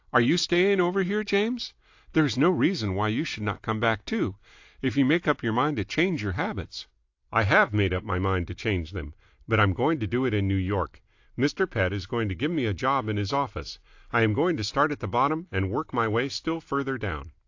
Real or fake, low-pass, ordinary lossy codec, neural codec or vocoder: real; 7.2 kHz; AAC, 48 kbps; none